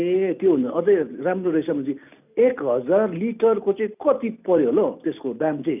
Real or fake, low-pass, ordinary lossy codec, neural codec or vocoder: real; 3.6 kHz; none; none